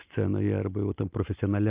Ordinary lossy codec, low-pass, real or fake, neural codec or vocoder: Opus, 32 kbps; 3.6 kHz; real; none